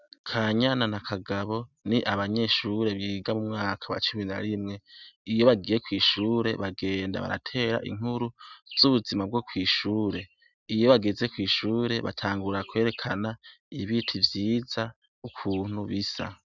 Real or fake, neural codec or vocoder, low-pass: real; none; 7.2 kHz